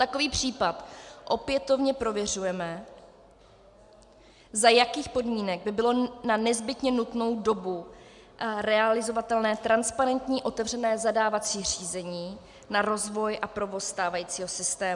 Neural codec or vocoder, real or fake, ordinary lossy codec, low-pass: none; real; MP3, 96 kbps; 10.8 kHz